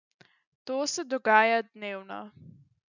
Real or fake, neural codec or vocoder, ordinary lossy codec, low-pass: real; none; none; 7.2 kHz